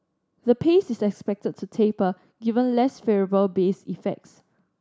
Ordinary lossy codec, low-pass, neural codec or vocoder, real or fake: none; none; none; real